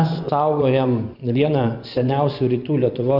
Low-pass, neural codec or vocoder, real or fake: 5.4 kHz; vocoder, 24 kHz, 100 mel bands, Vocos; fake